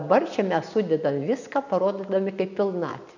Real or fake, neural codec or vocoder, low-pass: real; none; 7.2 kHz